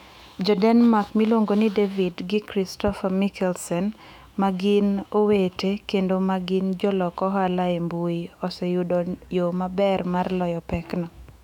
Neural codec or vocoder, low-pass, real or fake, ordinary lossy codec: autoencoder, 48 kHz, 128 numbers a frame, DAC-VAE, trained on Japanese speech; 19.8 kHz; fake; none